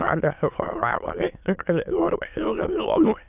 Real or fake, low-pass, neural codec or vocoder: fake; 3.6 kHz; autoencoder, 22.05 kHz, a latent of 192 numbers a frame, VITS, trained on many speakers